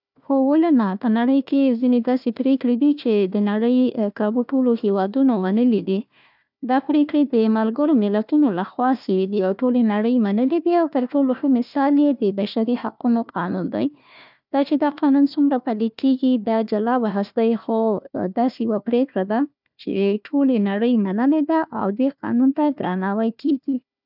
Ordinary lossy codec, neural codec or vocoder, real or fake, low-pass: none; codec, 16 kHz, 1 kbps, FunCodec, trained on Chinese and English, 50 frames a second; fake; 5.4 kHz